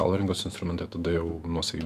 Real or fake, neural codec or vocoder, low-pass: fake; autoencoder, 48 kHz, 128 numbers a frame, DAC-VAE, trained on Japanese speech; 14.4 kHz